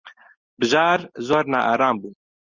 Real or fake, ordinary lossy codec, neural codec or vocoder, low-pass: real; Opus, 64 kbps; none; 7.2 kHz